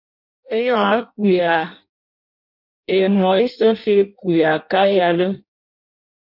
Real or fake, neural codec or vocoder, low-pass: fake; codec, 16 kHz in and 24 kHz out, 0.6 kbps, FireRedTTS-2 codec; 5.4 kHz